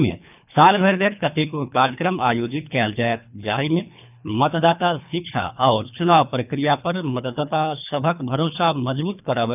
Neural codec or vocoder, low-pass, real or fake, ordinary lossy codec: codec, 24 kHz, 3 kbps, HILCodec; 3.6 kHz; fake; none